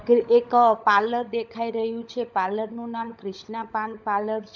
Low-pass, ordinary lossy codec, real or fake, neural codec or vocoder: 7.2 kHz; none; fake; codec, 16 kHz, 16 kbps, FunCodec, trained on LibriTTS, 50 frames a second